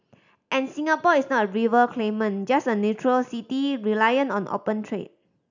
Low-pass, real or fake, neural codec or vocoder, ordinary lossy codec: 7.2 kHz; real; none; none